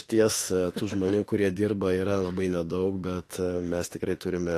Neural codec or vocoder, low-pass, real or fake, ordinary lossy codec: autoencoder, 48 kHz, 32 numbers a frame, DAC-VAE, trained on Japanese speech; 14.4 kHz; fake; AAC, 48 kbps